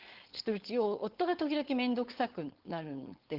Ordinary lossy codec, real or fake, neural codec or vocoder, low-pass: Opus, 16 kbps; fake; codec, 16 kHz, 4.8 kbps, FACodec; 5.4 kHz